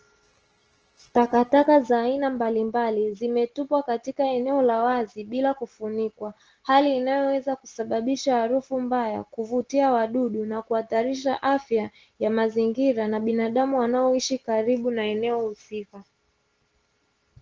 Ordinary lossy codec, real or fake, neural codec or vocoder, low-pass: Opus, 16 kbps; real; none; 7.2 kHz